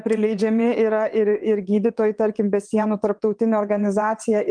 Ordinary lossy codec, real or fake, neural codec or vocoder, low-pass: Opus, 32 kbps; fake; vocoder, 22.05 kHz, 80 mel bands, WaveNeXt; 9.9 kHz